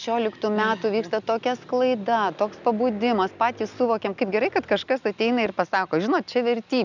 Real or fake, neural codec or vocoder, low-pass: real; none; 7.2 kHz